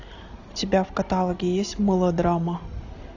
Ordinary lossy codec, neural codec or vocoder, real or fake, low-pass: AAC, 48 kbps; none; real; 7.2 kHz